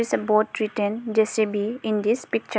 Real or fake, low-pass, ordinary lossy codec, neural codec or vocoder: real; none; none; none